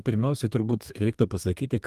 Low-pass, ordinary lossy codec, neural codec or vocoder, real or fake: 14.4 kHz; Opus, 32 kbps; codec, 32 kHz, 1.9 kbps, SNAC; fake